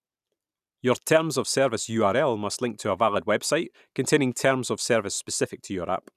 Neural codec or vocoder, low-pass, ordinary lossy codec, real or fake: none; 14.4 kHz; none; real